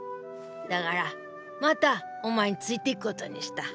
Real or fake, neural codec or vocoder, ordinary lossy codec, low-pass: real; none; none; none